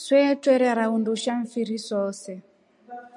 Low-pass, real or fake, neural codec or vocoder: 10.8 kHz; real; none